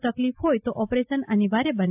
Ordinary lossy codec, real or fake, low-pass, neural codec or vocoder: AAC, 32 kbps; real; 3.6 kHz; none